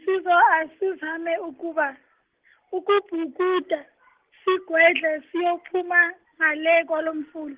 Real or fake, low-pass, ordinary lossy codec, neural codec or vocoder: real; 3.6 kHz; Opus, 16 kbps; none